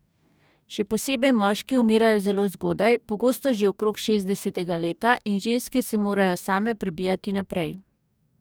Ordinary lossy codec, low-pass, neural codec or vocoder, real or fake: none; none; codec, 44.1 kHz, 2.6 kbps, DAC; fake